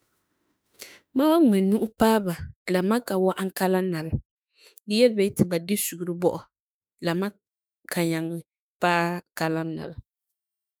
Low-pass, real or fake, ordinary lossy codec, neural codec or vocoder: none; fake; none; autoencoder, 48 kHz, 32 numbers a frame, DAC-VAE, trained on Japanese speech